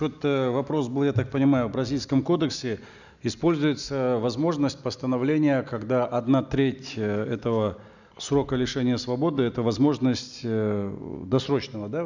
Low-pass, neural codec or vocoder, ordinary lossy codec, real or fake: 7.2 kHz; none; none; real